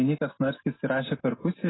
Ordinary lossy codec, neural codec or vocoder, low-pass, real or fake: AAC, 16 kbps; none; 7.2 kHz; real